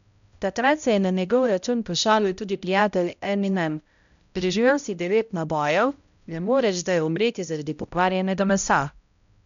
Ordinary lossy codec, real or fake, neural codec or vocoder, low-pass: none; fake; codec, 16 kHz, 0.5 kbps, X-Codec, HuBERT features, trained on balanced general audio; 7.2 kHz